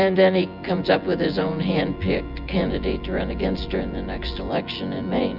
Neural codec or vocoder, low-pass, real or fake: vocoder, 24 kHz, 100 mel bands, Vocos; 5.4 kHz; fake